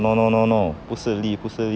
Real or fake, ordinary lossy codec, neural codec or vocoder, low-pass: real; none; none; none